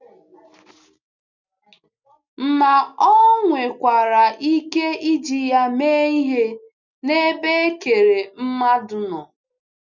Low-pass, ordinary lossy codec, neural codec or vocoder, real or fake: 7.2 kHz; none; none; real